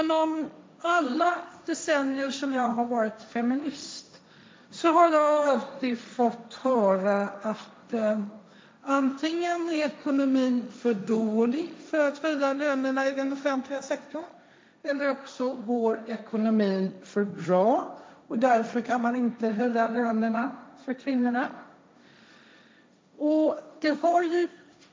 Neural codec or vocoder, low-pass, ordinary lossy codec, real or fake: codec, 16 kHz, 1.1 kbps, Voila-Tokenizer; none; none; fake